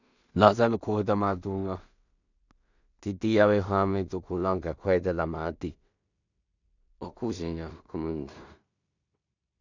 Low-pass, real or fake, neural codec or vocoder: 7.2 kHz; fake; codec, 16 kHz in and 24 kHz out, 0.4 kbps, LongCat-Audio-Codec, two codebook decoder